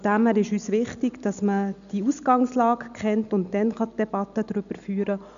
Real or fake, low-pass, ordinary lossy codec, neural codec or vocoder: real; 7.2 kHz; none; none